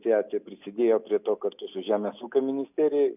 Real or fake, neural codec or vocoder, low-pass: real; none; 3.6 kHz